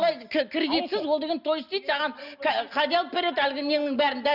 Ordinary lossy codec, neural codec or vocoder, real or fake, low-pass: none; none; real; 5.4 kHz